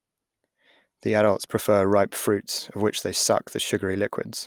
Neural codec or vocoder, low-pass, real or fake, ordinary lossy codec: none; 19.8 kHz; real; Opus, 32 kbps